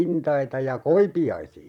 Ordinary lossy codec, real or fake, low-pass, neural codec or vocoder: none; fake; 19.8 kHz; vocoder, 44.1 kHz, 128 mel bands, Pupu-Vocoder